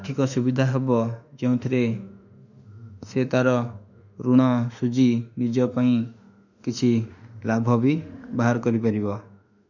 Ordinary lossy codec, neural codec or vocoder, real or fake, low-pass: none; autoencoder, 48 kHz, 32 numbers a frame, DAC-VAE, trained on Japanese speech; fake; 7.2 kHz